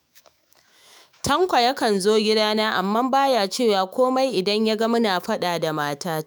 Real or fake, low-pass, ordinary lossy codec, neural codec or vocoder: fake; none; none; autoencoder, 48 kHz, 128 numbers a frame, DAC-VAE, trained on Japanese speech